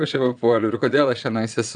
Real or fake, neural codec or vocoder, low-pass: fake; vocoder, 22.05 kHz, 80 mel bands, Vocos; 9.9 kHz